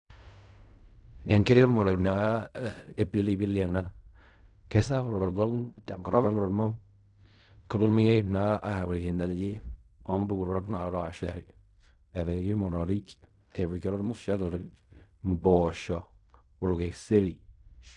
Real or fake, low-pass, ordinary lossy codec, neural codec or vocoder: fake; 10.8 kHz; none; codec, 16 kHz in and 24 kHz out, 0.4 kbps, LongCat-Audio-Codec, fine tuned four codebook decoder